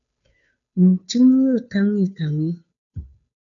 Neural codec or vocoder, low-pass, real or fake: codec, 16 kHz, 2 kbps, FunCodec, trained on Chinese and English, 25 frames a second; 7.2 kHz; fake